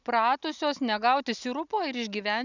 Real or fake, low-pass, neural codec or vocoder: real; 7.2 kHz; none